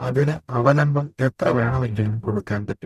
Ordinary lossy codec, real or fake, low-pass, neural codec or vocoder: none; fake; 14.4 kHz; codec, 44.1 kHz, 0.9 kbps, DAC